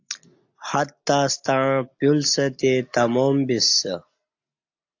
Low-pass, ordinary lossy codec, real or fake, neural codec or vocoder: 7.2 kHz; AAC, 48 kbps; real; none